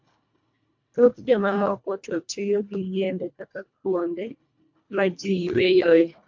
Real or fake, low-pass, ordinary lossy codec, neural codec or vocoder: fake; 7.2 kHz; MP3, 48 kbps; codec, 24 kHz, 1.5 kbps, HILCodec